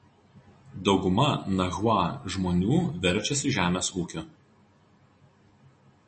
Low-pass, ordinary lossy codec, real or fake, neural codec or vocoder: 10.8 kHz; MP3, 32 kbps; real; none